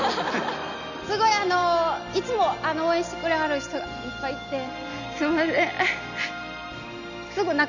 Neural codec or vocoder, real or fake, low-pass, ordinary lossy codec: none; real; 7.2 kHz; none